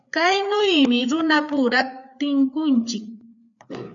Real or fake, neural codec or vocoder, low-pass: fake; codec, 16 kHz, 4 kbps, FreqCodec, larger model; 7.2 kHz